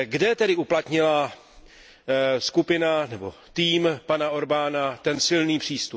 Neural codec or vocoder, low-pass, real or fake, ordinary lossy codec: none; none; real; none